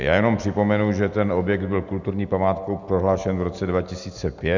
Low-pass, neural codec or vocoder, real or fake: 7.2 kHz; none; real